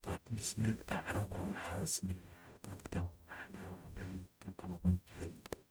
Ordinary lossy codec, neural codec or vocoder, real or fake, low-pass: none; codec, 44.1 kHz, 0.9 kbps, DAC; fake; none